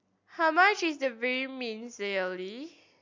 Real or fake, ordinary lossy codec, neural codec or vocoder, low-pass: real; MP3, 64 kbps; none; 7.2 kHz